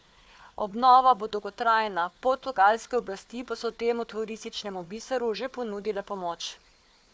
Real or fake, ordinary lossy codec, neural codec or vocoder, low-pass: fake; none; codec, 16 kHz, 4 kbps, FunCodec, trained on Chinese and English, 50 frames a second; none